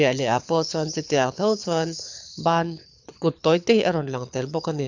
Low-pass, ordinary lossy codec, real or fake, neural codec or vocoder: 7.2 kHz; none; fake; codec, 24 kHz, 6 kbps, HILCodec